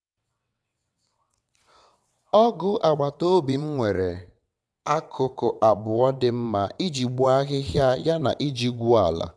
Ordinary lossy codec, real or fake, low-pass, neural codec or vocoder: none; fake; none; vocoder, 22.05 kHz, 80 mel bands, WaveNeXt